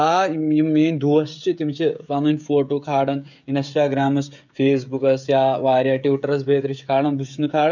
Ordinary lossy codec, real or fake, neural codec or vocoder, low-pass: none; fake; codec, 16 kHz, 16 kbps, FreqCodec, smaller model; 7.2 kHz